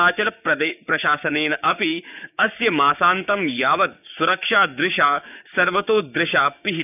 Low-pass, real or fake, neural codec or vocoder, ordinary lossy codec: 3.6 kHz; fake; codec, 16 kHz, 6 kbps, DAC; none